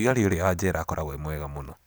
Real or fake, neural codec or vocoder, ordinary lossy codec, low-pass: real; none; none; none